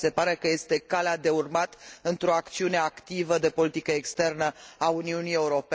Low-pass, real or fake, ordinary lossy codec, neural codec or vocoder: none; real; none; none